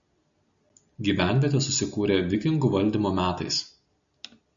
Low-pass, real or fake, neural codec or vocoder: 7.2 kHz; real; none